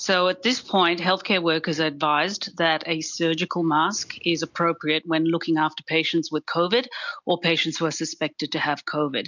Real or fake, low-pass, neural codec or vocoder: real; 7.2 kHz; none